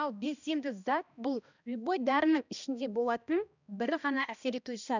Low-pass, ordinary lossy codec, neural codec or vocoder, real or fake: 7.2 kHz; none; codec, 16 kHz, 1 kbps, X-Codec, HuBERT features, trained on balanced general audio; fake